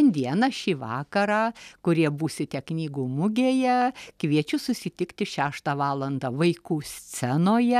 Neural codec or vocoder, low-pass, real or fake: none; 14.4 kHz; real